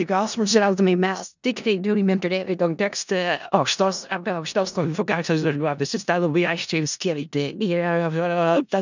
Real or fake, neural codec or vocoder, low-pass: fake; codec, 16 kHz in and 24 kHz out, 0.4 kbps, LongCat-Audio-Codec, four codebook decoder; 7.2 kHz